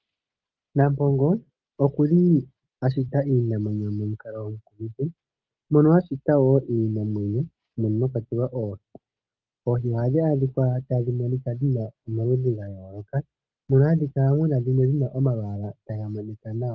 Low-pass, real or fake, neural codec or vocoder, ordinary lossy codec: 7.2 kHz; real; none; Opus, 24 kbps